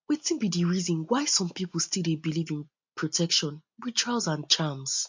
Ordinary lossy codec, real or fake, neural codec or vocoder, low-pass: MP3, 48 kbps; real; none; 7.2 kHz